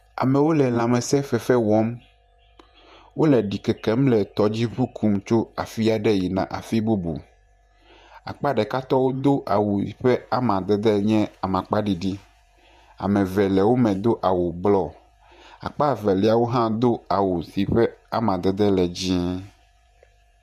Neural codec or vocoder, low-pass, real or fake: vocoder, 48 kHz, 128 mel bands, Vocos; 14.4 kHz; fake